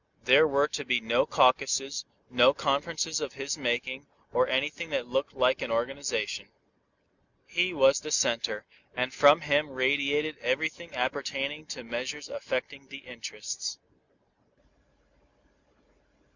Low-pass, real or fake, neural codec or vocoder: 7.2 kHz; real; none